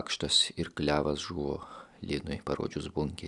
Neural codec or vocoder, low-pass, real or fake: none; 10.8 kHz; real